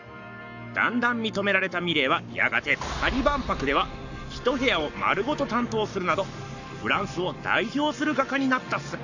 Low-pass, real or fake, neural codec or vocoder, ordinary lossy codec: 7.2 kHz; fake; codec, 44.1 kHz, 7.8 kbps, Pupu-Codec; none